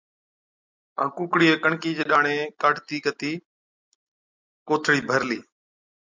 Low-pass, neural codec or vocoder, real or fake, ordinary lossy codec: 7.2 kHz; none; real; MP3, 64 kbps